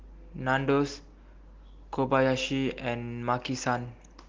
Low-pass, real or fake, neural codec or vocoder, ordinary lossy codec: 7.2 kHz; real; none; Opus, 16 kbps